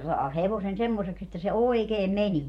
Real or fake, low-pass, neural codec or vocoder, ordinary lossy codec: fake; 19.8 kHz; vocoder, 44.1 kHz, 128 mel bands every 512 samples, BigVGAN v2; MP3, 64 kbps